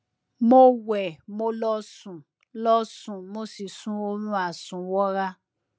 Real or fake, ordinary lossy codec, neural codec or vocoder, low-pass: real; none; none; none